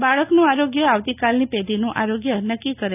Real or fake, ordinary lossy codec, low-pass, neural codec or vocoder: real; none; 3.6 kHz; none